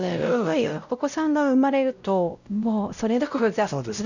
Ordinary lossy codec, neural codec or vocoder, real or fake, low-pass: none; codec, 16 kHz, 0.5 kbps, X-Codec, WavLM features, trained on Multilingual LibriSpeech; fake; 7.2 kHz